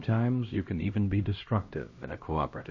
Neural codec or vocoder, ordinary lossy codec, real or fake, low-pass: codec, 16 kHz, 1 kbps, X-Codec, HuBERT features, trained on LibriSpeech; MP3, 32 kbps; fake; 7.2 kHz